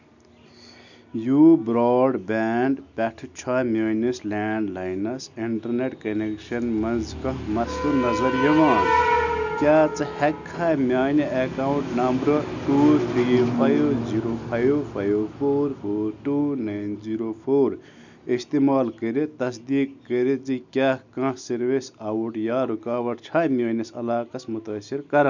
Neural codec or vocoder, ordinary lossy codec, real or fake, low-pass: none; none; real; 7.2 kHz